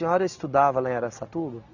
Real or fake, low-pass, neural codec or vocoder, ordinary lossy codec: real; 7.2 kHz; none; none